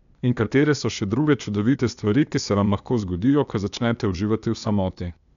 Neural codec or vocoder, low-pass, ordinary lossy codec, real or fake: codec, 16 kHz, 0.8 kbps, ZipCodec; 7.2 kHz; none; fake